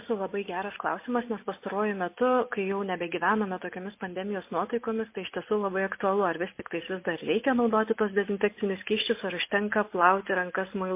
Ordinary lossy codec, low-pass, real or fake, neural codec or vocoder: MP3, 24 kbps; 3.6 kHz; real; none